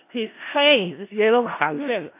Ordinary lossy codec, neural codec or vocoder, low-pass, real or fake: AAC, 24 kbps; codec, 16 kHz in and 24 kHz out, 0.4 kbps, LongCat-Audio-Codec, four codebook decoder; 3.6 kHz; fake